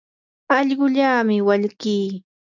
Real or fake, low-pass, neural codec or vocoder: real; 7.2 kHz; none